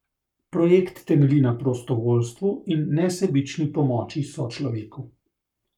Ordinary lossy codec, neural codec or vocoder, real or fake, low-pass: none; codec, 44.1 kHz, 7.8 kbps, Pupu-Codec; fake; 19.8 kHz